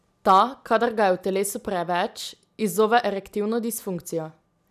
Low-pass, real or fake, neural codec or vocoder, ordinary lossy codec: 14.4 kHz; real; none; none